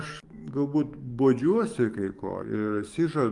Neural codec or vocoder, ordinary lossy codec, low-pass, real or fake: codec, 44.1 kHz, 7.8 kbps, DAC; Opus, 32 kbps; 10.8 kHz; fake